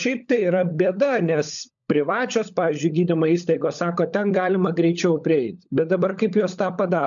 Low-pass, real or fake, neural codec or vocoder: 7.2 kHz; fake; codec, 16 kHz, 16 kbps, FunCodec, trained on LibriTTS, 50 frames a second